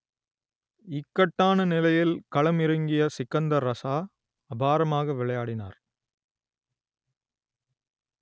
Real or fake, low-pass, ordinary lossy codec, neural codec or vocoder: real; none; none; none